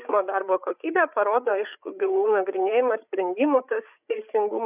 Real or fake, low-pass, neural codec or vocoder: fake; 3.6 kHz; codec, 16 kHz, 8 kbps, FreqCodec, larger model